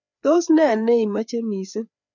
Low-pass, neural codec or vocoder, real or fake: 7.2 kHz; codec, 16 kHz, 4 kbps, FreqCodec, larger model; fake